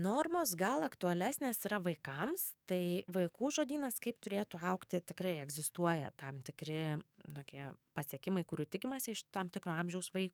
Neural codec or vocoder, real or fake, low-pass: codec, 44.1 kHz, 7.8 kbps, DAC; fake; 19.8 kHz